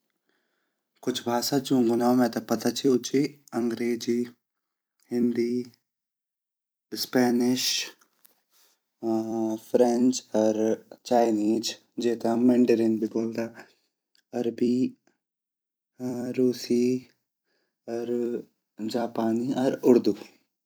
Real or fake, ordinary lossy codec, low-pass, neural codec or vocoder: fake; none; none; vocoder, 44.1 kHz, 128 mel bands every 256 samples, BigVGAN v2